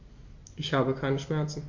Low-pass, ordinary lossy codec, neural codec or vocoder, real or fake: 7.2 kHz; MP3, 48 kbps; none; real